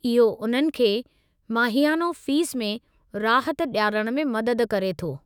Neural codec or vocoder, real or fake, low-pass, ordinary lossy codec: autoencoder, 48 kHz, 128 numbers a frame, DAC-VAE, trained on Japanese speech; fake; none; none